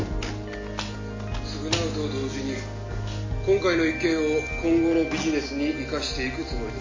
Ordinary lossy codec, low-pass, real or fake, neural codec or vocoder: MP3, 32 kbps; 7.2 kHz; real; none